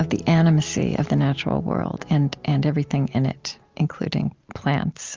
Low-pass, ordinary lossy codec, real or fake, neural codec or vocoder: 7.2 kHz; Opus, 32 kbps; real; none